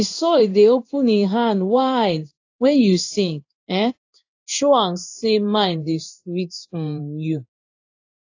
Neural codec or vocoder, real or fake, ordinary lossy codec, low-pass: codec, 16 kHz in and 24 kHz out, 1 kbps, XY-Tokenizer; fake; AAC, 48 kbps; 7.2 kHz